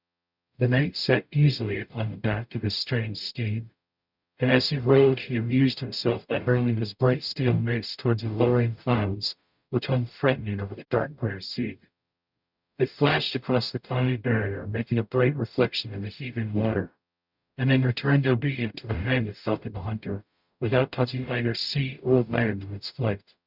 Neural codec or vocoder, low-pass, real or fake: codec, 44.1 kHz, 0.9 kbps, DAC; 5.4 kHz; fake